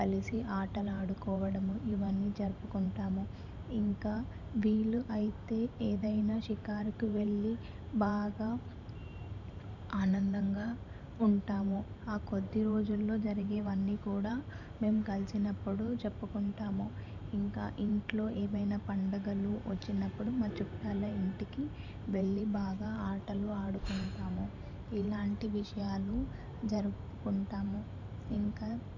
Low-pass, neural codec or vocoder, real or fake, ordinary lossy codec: 7.2 kHz; vocoder, 44.1 kHz, 128 mel bands every 512 samples, BigVGAN v2; fake; none